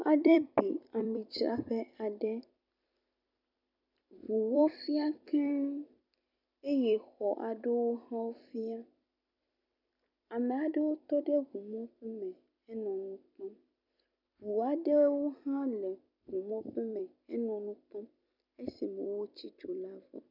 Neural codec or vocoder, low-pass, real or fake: vocoder, 44.1 kHz, 128 mel bands every 256 samples, BigVGAN v2; 5.4 kHz; fake